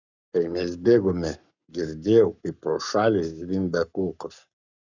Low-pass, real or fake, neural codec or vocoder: 7.2 kHz; fake; codec, 44.1 kHz, 7.8 kbps, Pupu-Codec